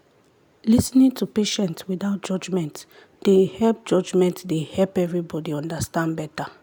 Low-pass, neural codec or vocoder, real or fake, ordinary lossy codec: none; none; real; none